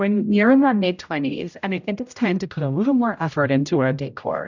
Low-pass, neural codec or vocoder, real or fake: 7.2 kHz; codec, 16 kHz, 0.5 kbps, X-Codec, HuBERT features, trained on general audio; fake